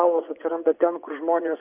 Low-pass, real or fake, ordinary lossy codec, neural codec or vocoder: 3.6 kHz; fake; AAC, 32 kbps; vocoder, 24 kHz, 100 mel bands, Vocos